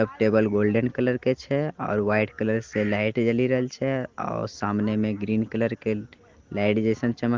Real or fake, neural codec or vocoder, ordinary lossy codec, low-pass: real; none; Opus, 16 kbps; 7.2 kHz